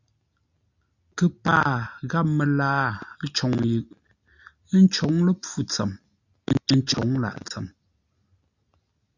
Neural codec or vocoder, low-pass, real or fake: none; 7.2 kHz; real